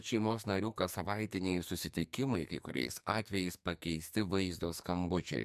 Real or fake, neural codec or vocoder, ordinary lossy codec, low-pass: fake; codec, 44.1 kHz, 2.6 kbps, SNAC; MP3, 96 kbps; 14.4 kHz